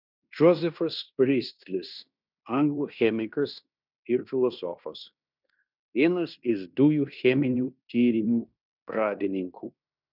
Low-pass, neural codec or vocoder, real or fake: 5.4 kHz; codec, 16 kHz in and 24 kHz out, 0.9 kbps, LongCat-Audio-Codec, fine tuned four codebook decoder; fake